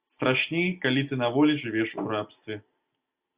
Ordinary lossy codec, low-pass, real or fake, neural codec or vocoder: Opus, 64 kbps; 3.6 kHz; real; none